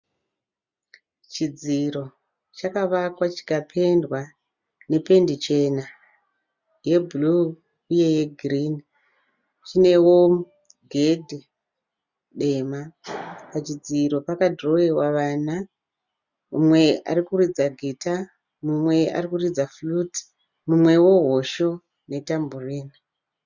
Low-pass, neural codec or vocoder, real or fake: 7.2 kHz; none; real